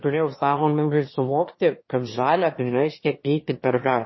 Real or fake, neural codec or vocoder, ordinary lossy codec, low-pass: fake; autoencoder, 22.05 kHz, a latent of 192 numbers a frame, VITS, trained on one speaker; MP3, 24 kbps; 7.2 kHz